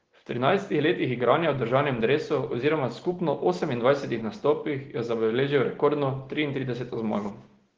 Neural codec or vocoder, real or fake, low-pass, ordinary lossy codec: none; real; 7.2 kHz; Opus, 16 kbps